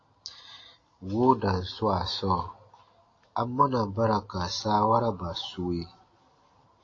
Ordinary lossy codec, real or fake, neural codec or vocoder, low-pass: AAC, 32 kbps; real; none; 7.2 kHz